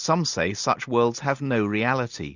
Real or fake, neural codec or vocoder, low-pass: real; none; 7.2 kHz